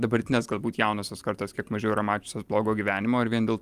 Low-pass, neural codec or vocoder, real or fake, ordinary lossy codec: 14.4 kHz; none; real; Opus, 32 kbps